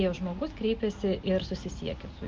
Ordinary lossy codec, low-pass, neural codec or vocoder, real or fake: Opus, 32 kbps; 7.2 kHz; none; real